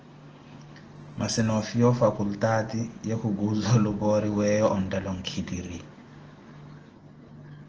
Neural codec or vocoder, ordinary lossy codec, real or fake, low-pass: none; Opus, 16 kbps; real; 7.2 kHz